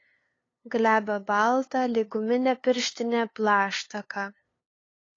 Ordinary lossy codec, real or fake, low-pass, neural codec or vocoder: AAC, 32 kbps; fake; 7.2 kHz; codec, 16 kHz, 2 kbps, FunCodec, trained on LibriTTS, 25 frames a second